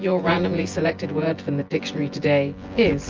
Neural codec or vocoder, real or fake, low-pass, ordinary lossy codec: vocoder, 24 kHz, 100 mel bands, Vocos; fake; 7.2 kHz; Opus, 24 kbps